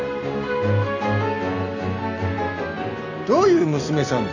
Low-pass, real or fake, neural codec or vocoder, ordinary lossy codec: 7.2 kHz; real; none; none